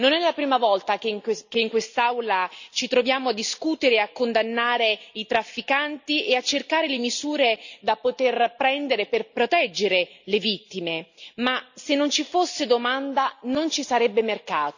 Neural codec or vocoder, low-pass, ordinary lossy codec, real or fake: none; 7.2 kHz; none; real